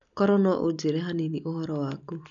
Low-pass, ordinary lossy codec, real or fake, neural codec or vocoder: 7.2 kHz; none; real; none